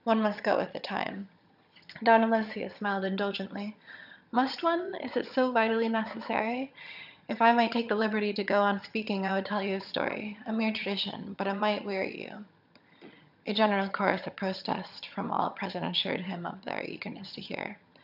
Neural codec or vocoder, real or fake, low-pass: vocoder, 22.05 kHz, 80 mel bands, HiFi-GAN; fake; 5.4 kHz